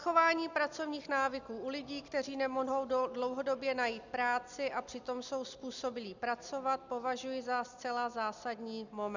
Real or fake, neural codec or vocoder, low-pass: real; none; 7.2 kHz